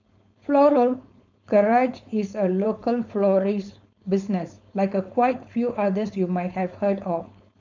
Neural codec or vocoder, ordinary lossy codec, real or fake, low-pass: codec, 16 kHz, 4.8 kbps, FACodec; none; fake; 7.2 kHz